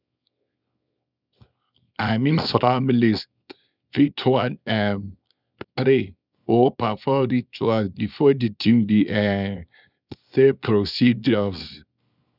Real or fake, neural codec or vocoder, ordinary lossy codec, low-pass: fake; codec, 24 kHz, 0.9 kbps, WavTokenizer, small release; none; 5.4 kHz